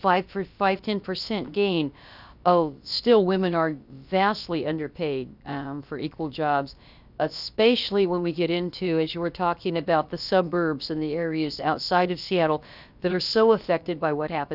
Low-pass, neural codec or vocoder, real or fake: 5.4 kHz; codec, 16 kHz, about 1 kbps, DyCAST, with the encoder's durations; fake